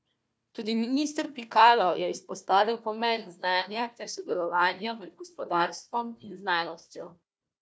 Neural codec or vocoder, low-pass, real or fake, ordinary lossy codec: codec, 16 kHz, 1 kbps, FunCodec, trained on Chinese and English, 50 frames a second; none; fake; none